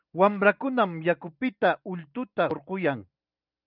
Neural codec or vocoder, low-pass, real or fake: none; 5.4 kHz; real